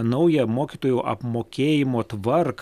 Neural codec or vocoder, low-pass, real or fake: none; 14.4 kHz; real